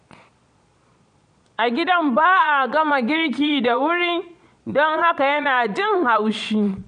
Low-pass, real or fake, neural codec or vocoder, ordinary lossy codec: 9.9 kHz; fake; vocoder, 22.05 kHz, 80 mel bands, Vocos; none